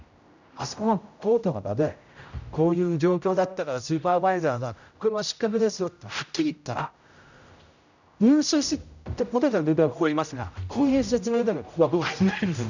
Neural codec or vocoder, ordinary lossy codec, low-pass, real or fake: codec, 16 kHz, 0.5 kbps, X-Codec, HuBERT features, trained on general audio; none; 7.2 kHz; fake